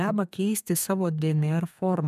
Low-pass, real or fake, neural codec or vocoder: 14.4 kHz; fake; codec, 32 kHz, 1.9 kbps, SNAC